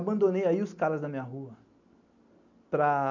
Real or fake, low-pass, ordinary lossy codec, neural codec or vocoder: real; 7.2 kHz; none; none